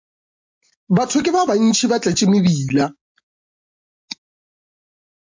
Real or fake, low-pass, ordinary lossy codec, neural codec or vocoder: real; 7.2 kHz; MP3, 48 kbps; none